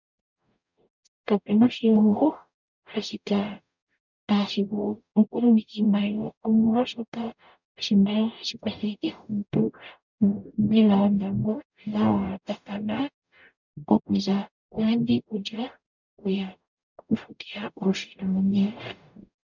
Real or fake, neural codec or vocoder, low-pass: fake; codec, 44.1 kHz, 0.9 kbps, DAC; 7.2 kHz